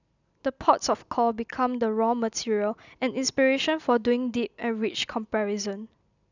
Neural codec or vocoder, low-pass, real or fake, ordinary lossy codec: none; 7.2 kHz; real; none